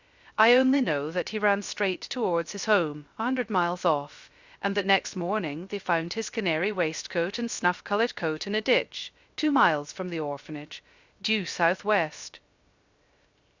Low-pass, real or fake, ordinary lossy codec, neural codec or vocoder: 7.2 kHz; fake; Opus, 64 kbps; codec, 16 kHz, 0.3 kbps, FocalCodec